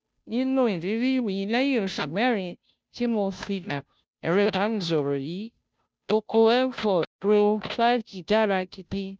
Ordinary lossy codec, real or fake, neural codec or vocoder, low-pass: none; fake; codec, 16 kHz, 0.5 kbps, FunCodec, trained on Chinese and English, 25 frames a second; none